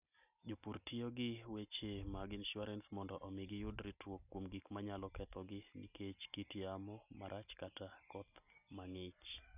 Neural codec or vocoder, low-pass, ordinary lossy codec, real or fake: none; 3.6 kHz; none; real